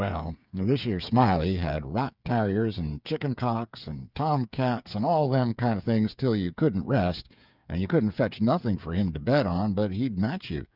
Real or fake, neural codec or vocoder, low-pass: fake; codec, 16 kHz, 8 kbps, FreqCodec, smaller model; 5.4 kHz